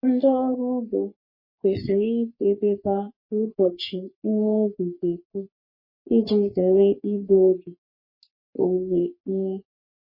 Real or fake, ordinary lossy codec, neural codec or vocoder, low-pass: fake; MP3, 24 kbps; codec, 44.1 kHz, 3.4 kbps, Pupu-Codec; 5.4 kHz